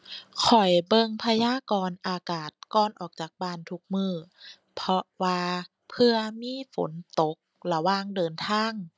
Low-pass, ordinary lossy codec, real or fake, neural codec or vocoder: none; none; real; none